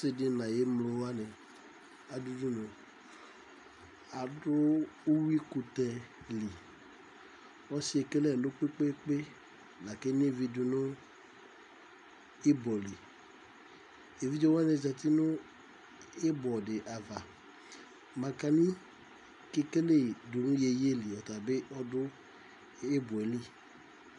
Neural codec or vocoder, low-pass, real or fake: none; 10.8 kHz; real